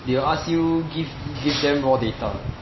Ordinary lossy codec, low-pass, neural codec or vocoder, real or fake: MP3, 24 kbps; 7.2 kHz; none; real